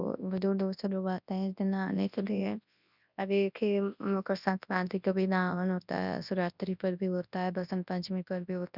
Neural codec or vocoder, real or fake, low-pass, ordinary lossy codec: codec, 24 kHz, 0.9 kbps, WavTokenizer, large speech release; fake; 5.4 kHz; none